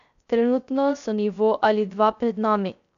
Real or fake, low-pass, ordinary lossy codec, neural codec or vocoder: fake; 7.2 kHz; none; codec, 16 kHz, 0.3 kbps, FocalCodec